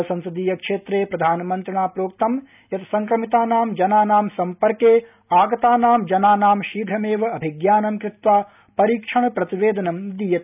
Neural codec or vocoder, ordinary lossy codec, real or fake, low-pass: none; none; real; 3.6 kHz